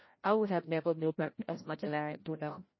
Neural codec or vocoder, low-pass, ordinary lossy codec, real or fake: codec, 16 kHz, 0.5 kbps, FreqCodec, larger model; 7.2 kHz; MP3, 24 kbps; fake